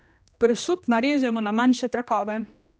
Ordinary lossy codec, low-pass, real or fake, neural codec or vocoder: none; none; fake; codec, 16 kHz, 1 kbps, X-Codec, HuBERT features, trained on general audio